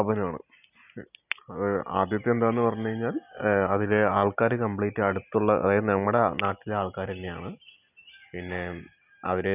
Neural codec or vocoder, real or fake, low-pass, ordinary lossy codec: none; real; 3.6 kHz; none